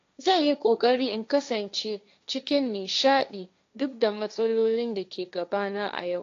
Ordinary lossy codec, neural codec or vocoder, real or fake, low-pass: AAC, 48 kbps; codec, 16 kHz, 1.1 kbps, Voila-Tokenizer; fake; 7.2 kHz